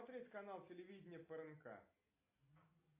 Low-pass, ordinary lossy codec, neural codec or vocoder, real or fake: 3.6 kHz; MP3, 24 kbps; none; real